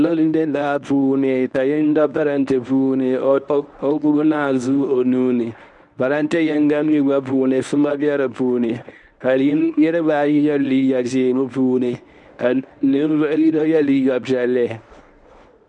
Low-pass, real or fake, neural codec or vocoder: 10.8 kHz; fake; codec, 24 kHz, 0.9 kbps, WavTokenizer, medium speech release version 1